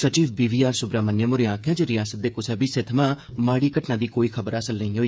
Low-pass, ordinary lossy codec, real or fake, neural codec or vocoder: none; none; fake; codec, 16 kHz, 8 kbps, FreqCodec, smaller model